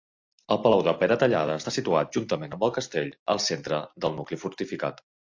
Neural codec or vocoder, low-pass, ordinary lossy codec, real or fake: none; 7.2 kHz; AAC, 48 kbps; real